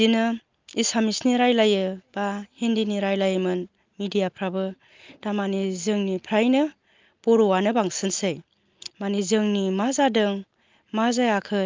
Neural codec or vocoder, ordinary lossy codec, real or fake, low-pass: none; Opus, 32 kbps; real; 7.2 kHz